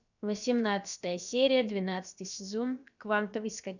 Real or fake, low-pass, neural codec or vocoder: fake; 7.2 kHz; codec, 16 kHz, about 1 kbps, DyCAST, with the encoder's durations